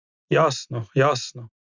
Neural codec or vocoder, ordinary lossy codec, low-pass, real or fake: none; Opus, 64 kbps; 7.2 kHz; real